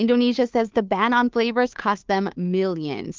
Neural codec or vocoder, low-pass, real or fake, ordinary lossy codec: codec, 16 kHz, 2 kbps, FunCodec, trained on Chinese and English, 25 frames a second; 7.2 kHz; fake; Opus, 32 kbps